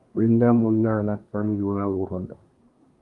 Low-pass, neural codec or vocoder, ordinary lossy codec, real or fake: 10.8 kHz; codec, 24 kHz, 1 kbps, SNAC; Opus, 32 kbps; fake